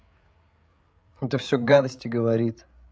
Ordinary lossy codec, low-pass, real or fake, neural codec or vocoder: none; none; fake; codec, 16 kHz, 8 kbps, FreqCodec, larger model